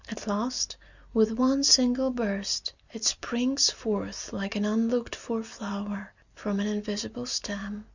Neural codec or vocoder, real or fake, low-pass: none; real; 7.2 kHz